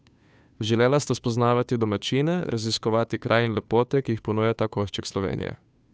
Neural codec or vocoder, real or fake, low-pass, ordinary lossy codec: codec, 16 kHz, 2 kbps, FunCodec, trained on Chinese and English, 25 frames a second; fake; none; none